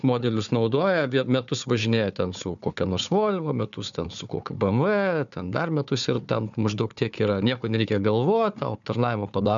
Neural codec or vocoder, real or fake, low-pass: codec, 16 kHz, 4 kbps, FunCodec, trained on Chinese and English, 50 frames a second; fake; 7.2 kHz